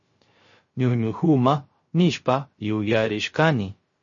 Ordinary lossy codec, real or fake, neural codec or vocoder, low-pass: MP3, 32 kbps; fake; codec, 16 kHz, 0.3 kbps, FocalCodec; 7.2 kHz